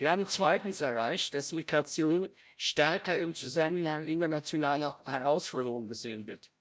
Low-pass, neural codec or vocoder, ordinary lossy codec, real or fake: none; codec, 16 kHz, 0.5 kbps, FreqCodec, larger model; none; fake